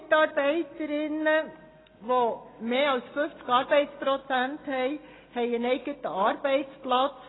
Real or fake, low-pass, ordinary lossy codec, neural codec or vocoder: real; 7.2 kHz; AAC, 16 kbps; none